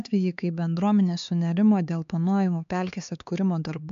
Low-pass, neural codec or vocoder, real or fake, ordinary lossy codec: 7.2 kHz; codec, 16 kHz, 4 kbps, X-Codec, HuBERT features, trained on LibriSpeech; fake; MP3, 64 kbps